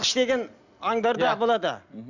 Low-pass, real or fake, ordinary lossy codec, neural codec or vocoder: 7.2 kHz; real; none; none